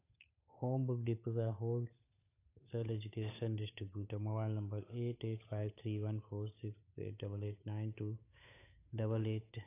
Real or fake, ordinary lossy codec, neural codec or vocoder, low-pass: fake; AAC, 24 kbps; codec, 16 kHz, 8 kbps, FunCodec, trained on Chinese and English, 25 frames a second; 3.6 kHz